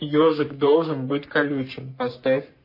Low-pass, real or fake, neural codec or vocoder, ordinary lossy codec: 5.4 kHz; fake; codec, 44.1 kHz, 3.4 kbps, Pupu-Codec; MP3, 32 kbps